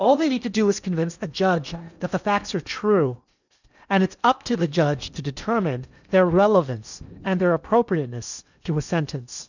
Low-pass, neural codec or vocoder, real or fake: 7.2 kHz; codec, 16 kHz in and 24 kHz out, 0.8 kbps, FocalCodec, streaming, 65536 codes; fake